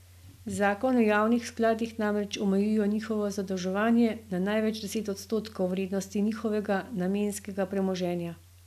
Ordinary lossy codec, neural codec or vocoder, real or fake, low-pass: none; none; real; 14.4 kHz